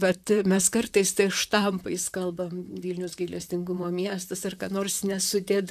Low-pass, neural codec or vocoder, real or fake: 14.4 kHz; vocoder, 44.1 kHz, 128 mel bands, Pupu-Vocoder; fake